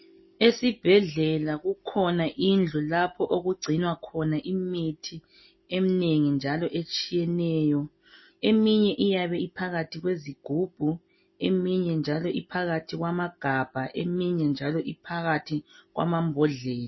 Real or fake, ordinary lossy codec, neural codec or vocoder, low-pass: real; MP3, 24 kbps; none; 7.2 kHz